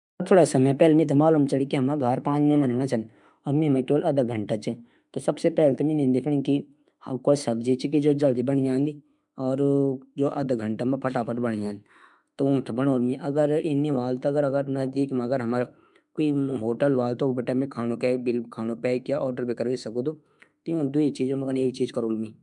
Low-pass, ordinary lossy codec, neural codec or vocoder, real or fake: 10.8 kHz; MP3, 96 kbps; autoencoder, 48 kHz, 32 numbers a frame, DAC-VAE, trained on Japanese speech; fake